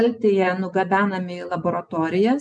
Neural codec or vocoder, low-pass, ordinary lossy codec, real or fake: none; 9.9 kHz; MP3, 96 kbps; real